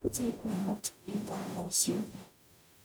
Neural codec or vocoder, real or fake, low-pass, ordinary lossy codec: codec, 44.1 kHz, 0.9 kbps, DAC; fake; none; none